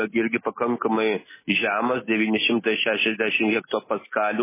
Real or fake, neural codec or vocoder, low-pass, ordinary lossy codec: real; none; 3.6 kHz; MP3, 16 kbps